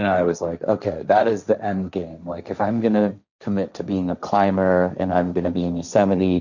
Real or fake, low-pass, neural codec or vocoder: fake; 7.2 kHz; codec, 16 kHz, 1.1 kbps, Voila-Tokenizer